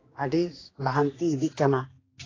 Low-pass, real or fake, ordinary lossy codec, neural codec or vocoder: 7.2 kHz; fake; AAC, 32 kbps; codec, 16 kHz, 2 kbps, X-Codec, HuBERT features, trained on general audio